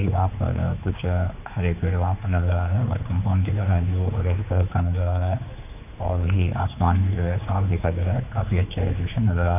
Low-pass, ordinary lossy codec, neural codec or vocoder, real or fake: 3.6 kHz; none; codec, 16 kHz, 4 kbps, X-Codec, HuBERT features, trained on general audio; fake